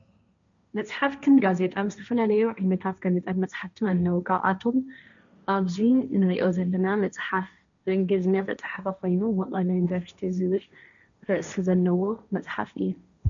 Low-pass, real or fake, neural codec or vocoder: 7.2 kHz; fake; codec, 16 kHz, 1.1 kbps, Voila-Tokenizer